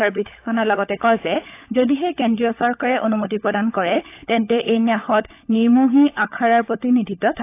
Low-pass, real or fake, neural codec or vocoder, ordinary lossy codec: 3.6 kHz; fake; codec, 16 kHz, 16 kbps, FunCodec, trained on LibriTTS, 50 frames a second; AAC, 24 kbps